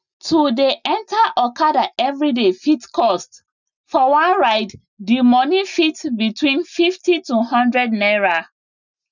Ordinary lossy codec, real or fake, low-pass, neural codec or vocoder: none; real; 7.2 kHz; none